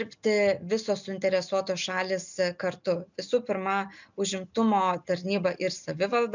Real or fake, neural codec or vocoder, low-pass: real; none; 7.2 kHz